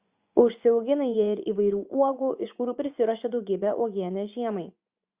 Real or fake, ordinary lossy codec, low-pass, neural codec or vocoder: fake; Opus, 64 kbps; 3.6 kHz; vocoder, 24 kHz, 100 mel bands, Vocos